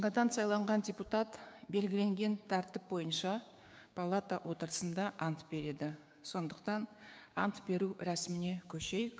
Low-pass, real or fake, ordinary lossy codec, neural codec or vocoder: none; fake; none; codec, 16 kHz, 6 kbps, DAC